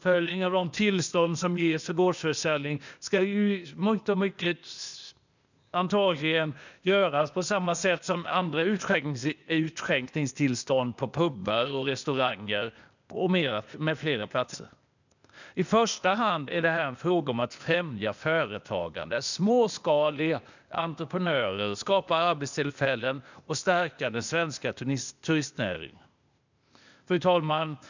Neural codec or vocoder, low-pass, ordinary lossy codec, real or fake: codec, 16 kHz, 0.8 kbps, ZipCodec; 7.2 kHz; none; fake